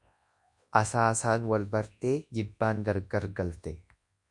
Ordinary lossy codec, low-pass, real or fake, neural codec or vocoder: MP3, 64 kbps; 10.8 kHz; fake; codec, 24 kHz, 0.9 kbps, WavTokenizer, large speech release